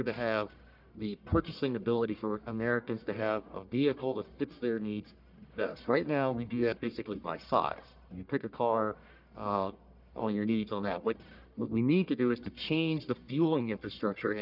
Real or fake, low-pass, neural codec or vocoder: fake; 5.4 kHz; codec, 44.1 kHz, 1.7 kbps, Pupu-Codec